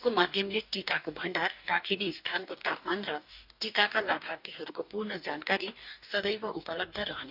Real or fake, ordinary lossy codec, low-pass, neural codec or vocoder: fake; none; 5.4 kHz; codec, 44.1 kHz, 2.6 kbps, DAC